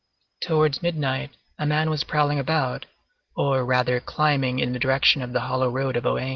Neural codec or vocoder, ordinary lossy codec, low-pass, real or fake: none; Opus, 16 kbps; 7.2 kHz; real